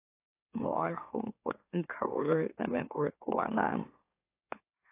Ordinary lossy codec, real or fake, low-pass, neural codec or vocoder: AAC, 24 kbps; fake; 3.6 kHz; autoencoder, 44.1 kHz, a latent of 192 numbers a frame, MeloTTS